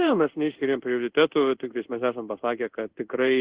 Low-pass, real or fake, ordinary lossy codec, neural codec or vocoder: 3.6 kHz; fake; Opus, 16 kbps; codec, 16 kHz in and 24 kHz out, 1 kbps, XY-Tokenizer